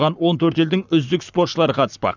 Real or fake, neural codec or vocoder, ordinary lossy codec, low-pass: fake; codec, 44.1 kHz, 7.8 kbps, Pupu-Codec; none; 7.2 kHz